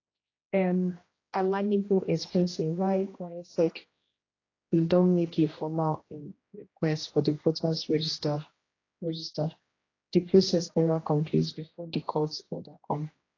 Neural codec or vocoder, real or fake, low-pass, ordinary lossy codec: codec, 16 kHz, 1 kbps, X-Codec, HuBERT features, trained on general audio; fake; 7.2 kHz; AAC, 32 kbps